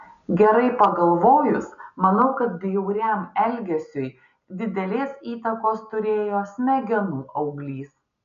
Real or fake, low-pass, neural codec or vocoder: real; 7.2 kHz; none